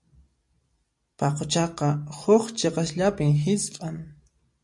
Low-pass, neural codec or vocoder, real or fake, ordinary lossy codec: 10.8 kHz; none; real; MP3, 48 kbps